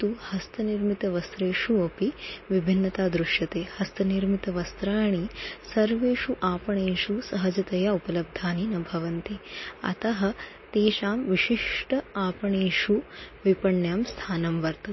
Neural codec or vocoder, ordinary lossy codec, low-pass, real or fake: none; MP3, 24 kbps; 7.2 kHz; real